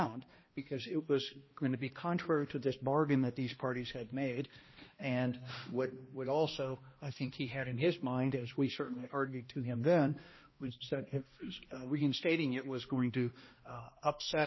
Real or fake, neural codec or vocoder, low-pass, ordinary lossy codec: fake; codec, 16 kHz, 1 kbps, X-Codec, HuBERT features, trained on balanced general audio; 7.2 kHz; MP3, 24 kbps